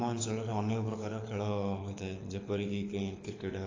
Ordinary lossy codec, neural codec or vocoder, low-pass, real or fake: AAC, 32 kbps; codec, 24 kHz, 6 kbps, HILCodec; 7.2 kHz; fake